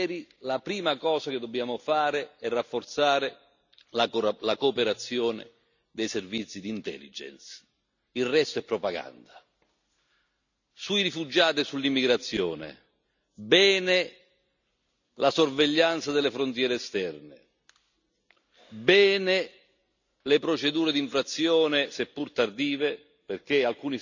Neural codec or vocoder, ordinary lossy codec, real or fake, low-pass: none; none; real; 7.2 kHz